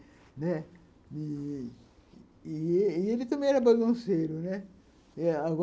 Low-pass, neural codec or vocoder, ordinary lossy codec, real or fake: none; none; none; real